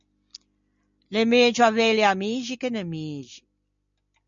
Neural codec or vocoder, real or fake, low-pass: none; real; 7.2 kHz